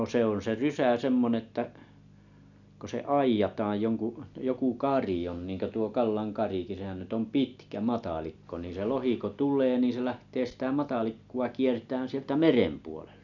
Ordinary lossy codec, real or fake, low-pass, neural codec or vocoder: none; real; 7.2 kHz; none